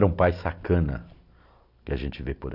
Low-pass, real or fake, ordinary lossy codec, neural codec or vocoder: 5.4 kHz; real; none; none